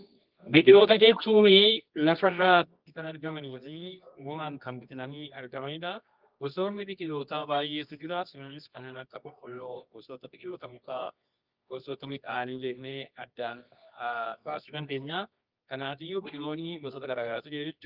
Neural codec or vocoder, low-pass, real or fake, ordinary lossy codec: codec, 24 kHz, 0.9 kbps, WavTokenizer, medium music audio release; 5.4 kHz; fake; Opus, 24 kbps